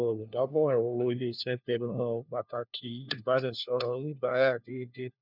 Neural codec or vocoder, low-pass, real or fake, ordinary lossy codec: codec, 16 kHz, 1 kbps, FunCodec, trained on LibriTTS, 50 frames a second; 5.4 kHz; fake; none